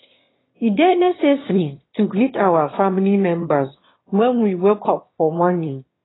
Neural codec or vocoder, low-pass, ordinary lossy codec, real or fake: autoencoder, 22.05 kHz, a latent of 192 numbers a frame, VITS, trained on one speaker; 7.2 kHz; AAC, 16 kbps; fake